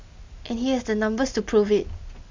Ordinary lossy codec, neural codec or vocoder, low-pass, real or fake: MP3, 64 kbps; none; 7.2 kHz; real